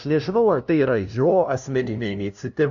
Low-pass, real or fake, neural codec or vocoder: 7.2 kHz; fake; codec, 16 kHz, 0.5 kbps, FunCodec, trained on LibriTTS, 25 frames a second